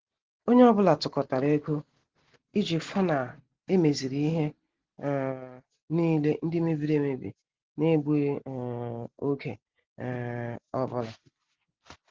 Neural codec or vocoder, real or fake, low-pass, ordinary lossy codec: none; real; 7.2 kHz; Opus, 16 kbps